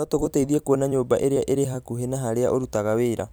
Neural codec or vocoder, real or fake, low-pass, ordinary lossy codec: vocoder, 44.1 kHz, 128 mel bands every 256 samples, BigVGAN v2; fake; none; none